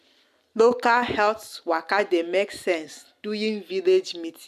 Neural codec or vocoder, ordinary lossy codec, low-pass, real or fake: none; AAC, 96 kbps; 14.4 kHz; real